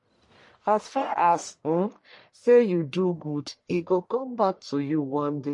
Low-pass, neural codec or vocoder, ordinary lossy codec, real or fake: 10.8 kHz; codec, 44.1 kHz, 1.7 kbps, Pupu-Codec; MP3, 48 kbps; fake